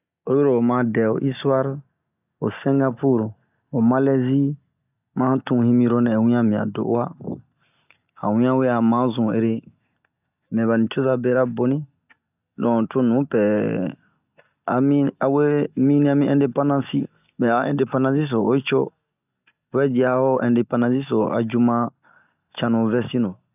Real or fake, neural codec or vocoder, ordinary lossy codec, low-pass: real; none; none; 3.6 kHz